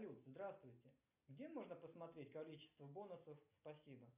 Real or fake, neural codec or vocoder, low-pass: real; none; 3.6 kHz